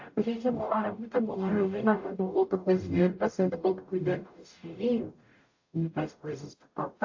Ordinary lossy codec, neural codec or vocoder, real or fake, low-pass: AAC, 48 kbps; codec, 44.1 kHz, 0.9 kbps, DAC; fake; 7.2 kHz